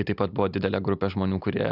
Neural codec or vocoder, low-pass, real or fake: none; 5.4 kHz; real